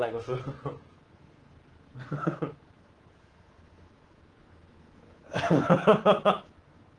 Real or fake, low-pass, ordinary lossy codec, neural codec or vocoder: fake; 9.9 kHz; Opus, 16 kbps; codec, 24 kHz, 3.1 kbps, DualCodec